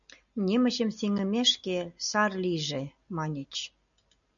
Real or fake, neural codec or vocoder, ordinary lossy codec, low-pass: real; none; MP3, 96 kbps; 7.2 kHz